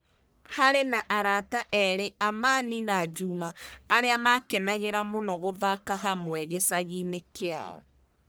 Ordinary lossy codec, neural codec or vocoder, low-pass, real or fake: none; codec, 44.1 kHz, 1.7 kbps, Pupu-Codec; none; fake